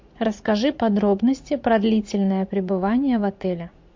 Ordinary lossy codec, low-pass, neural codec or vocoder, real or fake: MP3, 48 kbps; 7.2 kHz; vocoder, 44.1 kHz, 80 mel bands, Vocos; fake